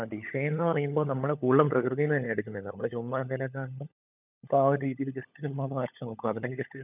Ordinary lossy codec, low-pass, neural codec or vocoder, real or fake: none; 3.6 kHz; codec, 16 kHz, 16 kbps, FunCodec, trained on LibriTTS, 50 frames a second; fake